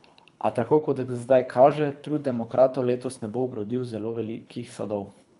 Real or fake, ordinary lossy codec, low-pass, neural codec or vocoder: fake; none; 10.8 kHz; codec, 24 kHz, 3 kbps, HILCodec